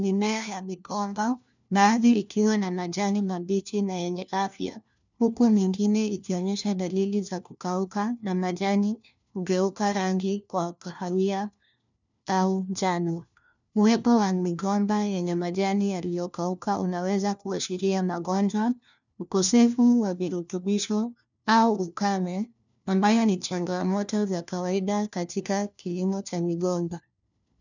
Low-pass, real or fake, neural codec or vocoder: 7.2 kHz; fake; codec, 16 kHz, 1 kbps, FunCodec, trained on LibriTTS, 50 frames a second